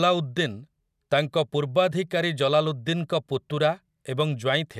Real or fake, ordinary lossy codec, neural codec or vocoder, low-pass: real; none; none; 14.4 kHz